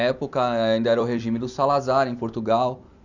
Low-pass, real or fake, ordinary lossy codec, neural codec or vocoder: 7.2 kHz; fake; none; vocoder, 44.1 kHz, 128 mel bands every 256 samples, BigVGAN v2